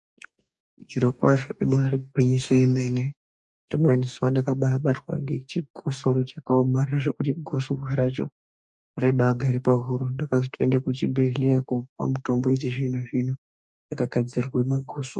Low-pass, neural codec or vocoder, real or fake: 10.8 kHz; codec, 44.1 kHz, 2.6 kbps, DAC; fake